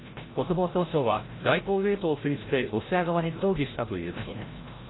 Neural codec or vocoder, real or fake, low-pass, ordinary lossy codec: codec, 16 kHz, 0.5 kbps, FreqCodec, larger model; fake; 7.2 kHz; AAC, 16 kbps